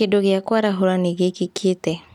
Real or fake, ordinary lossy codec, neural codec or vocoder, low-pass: real; none; none; 19.8 kHz